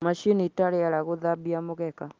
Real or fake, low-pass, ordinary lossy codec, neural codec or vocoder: real; 7.2 kHz; Opus, 24 kbps; none